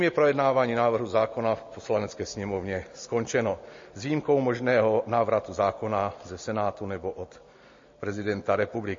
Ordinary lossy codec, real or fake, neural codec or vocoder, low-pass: MP3, 32 kbps; real; none; 7.2 kHz